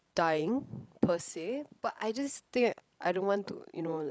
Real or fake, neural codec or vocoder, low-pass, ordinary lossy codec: fake; codec, 16 kHz, 16 kbps, FreqCodec, larger model; none; none